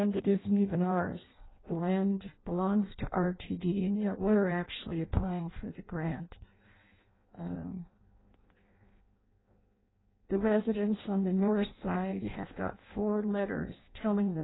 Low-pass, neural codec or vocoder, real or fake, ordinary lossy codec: 7.2 kHz; codec, 16 kHz in and 24 kHz out, 0.6 kbps, FireRedTTS-2 codec; fake; AAC, 16 kbps